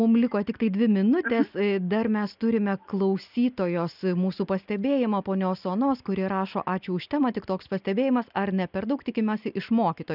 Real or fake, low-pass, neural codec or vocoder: real; 5.4 kHz; none